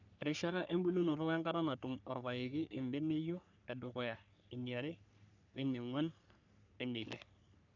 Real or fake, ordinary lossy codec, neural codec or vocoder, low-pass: fake; none; codec, 44.1 kHz, 3.4 kbps, Pupu-Codec; 7.2 kHz